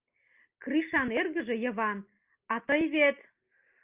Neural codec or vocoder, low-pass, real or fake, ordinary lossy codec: none; 3.6 kHz; real; Opus, 24 kbps